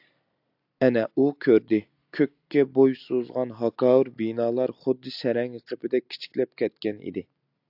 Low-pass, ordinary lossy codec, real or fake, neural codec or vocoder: 5.4 kHz; AAC, 48 kbps; real; none